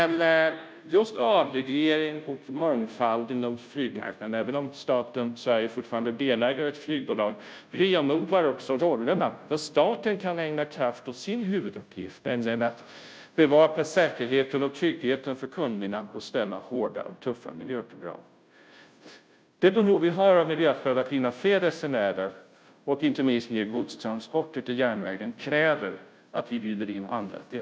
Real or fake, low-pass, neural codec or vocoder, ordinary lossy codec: fake; none; codec, 16 kHz, 0.5 kbps, FunCodec, trained on Chinese and English, 25 frames a second; none